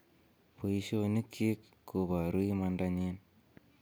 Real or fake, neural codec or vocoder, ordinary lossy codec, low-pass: real; none; none; none